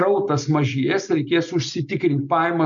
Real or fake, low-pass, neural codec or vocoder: real; 7.2 kHz; none